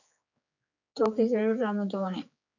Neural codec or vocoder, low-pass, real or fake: codec, 16 kHz, 4 kbps, X-Codec, HuBERT features, trained on general audio; 7.2 kHz; fake